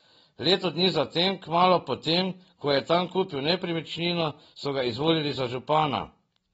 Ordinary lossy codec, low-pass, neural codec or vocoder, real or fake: AAC, 24 kbps; 19.8 kHz; none; real